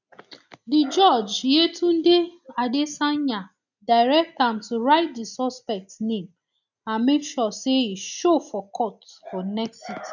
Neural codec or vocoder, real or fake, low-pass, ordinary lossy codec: none; real; 7.2 kHz; none